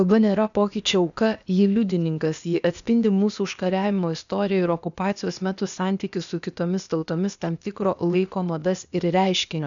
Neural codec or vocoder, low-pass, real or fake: codec, 16 kHz, 0.8 kbps, ZipCodec; 7.2 kHz; fake